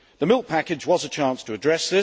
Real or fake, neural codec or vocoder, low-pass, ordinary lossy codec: real; none; none; none